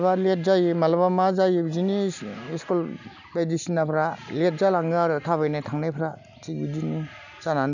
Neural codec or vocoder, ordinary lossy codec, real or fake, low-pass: none; none; real; 7.2 kHz